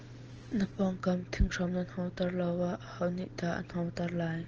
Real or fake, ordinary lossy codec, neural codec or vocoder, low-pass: real; Opus, 16 kbps; none; 7.2 kHz